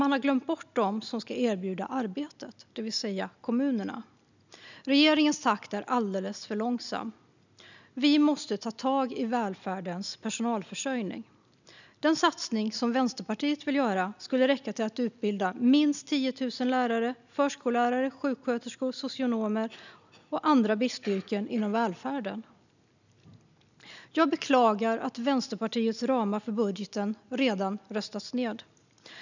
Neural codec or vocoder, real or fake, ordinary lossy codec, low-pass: none; real; none; 7.2 kHz